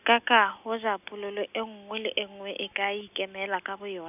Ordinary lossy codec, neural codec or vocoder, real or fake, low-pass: none; none; real; 3.6 kHz